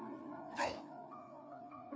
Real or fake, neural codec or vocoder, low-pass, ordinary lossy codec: fake; codec, 16 kHz, 4 kbps, FreqCodec, larger model; none; none